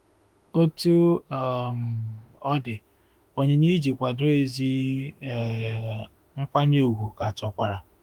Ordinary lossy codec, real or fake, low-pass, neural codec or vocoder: Opus, 32 kbps; fake; 19.8 kHz; autoencoder, 48 kHz, 32 numbers a frame, DAC-VAE, trained on Japanese speech